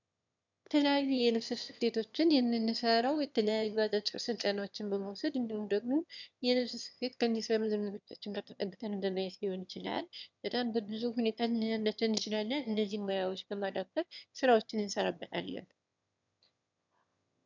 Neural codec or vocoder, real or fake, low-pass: autoencoder, 22.05 kHz, a latent of 192 numbers a frame, VITS, trained on one speaker; fake; 7.2 kHz